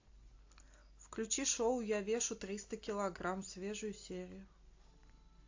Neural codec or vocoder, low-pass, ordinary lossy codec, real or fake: none; 7.2 kHz; AAC, 48 kbps; real